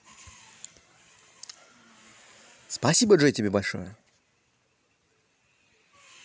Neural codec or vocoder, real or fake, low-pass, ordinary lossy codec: none; real; none; none